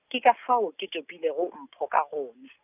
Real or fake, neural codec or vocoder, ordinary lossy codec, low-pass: real; none; none; 3.6 kHz